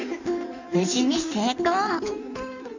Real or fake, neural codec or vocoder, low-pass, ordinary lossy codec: fake; codec, 16 kHz in and 24 kHz out, 1.1 kbps, FireRedTTS-2 codec; 7.2 kHz; none